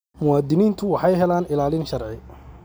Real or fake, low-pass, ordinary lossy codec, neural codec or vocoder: real; none; none; none